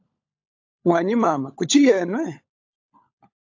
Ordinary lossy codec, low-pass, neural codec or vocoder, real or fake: AAC, 48 kbps; 7.2 kHz; codec, 16 kHz, 16 kbps, FunCodec, trained on LibriTTS, 50 frames a second; fake